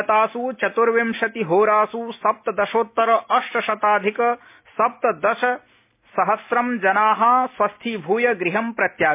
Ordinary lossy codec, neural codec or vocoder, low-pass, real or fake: MP3, 24 kbps; none; 3.6 kHz; real